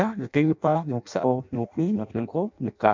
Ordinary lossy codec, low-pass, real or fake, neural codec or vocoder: MP3, 64 kbps; 7.2 kHz; fake; codec, 16 kHz in and 24 kHz out, 0.6 kbps, FireRedTTS-2 codec